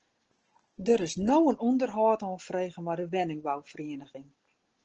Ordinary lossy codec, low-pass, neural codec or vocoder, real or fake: Opus, 16 kbps; 7.2 kHz; none; real